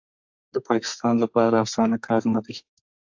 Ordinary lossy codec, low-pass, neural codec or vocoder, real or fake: AAC, 48 kbps; 7.2 kHz; codec, 32 kHz, 1.9 kbps, SNAC; fake